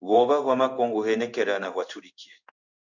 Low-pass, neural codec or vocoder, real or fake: 7.2 kHz; codec, 16 kHz in and 24 kHz out, 1 kbps, XY-Tokenizer; fake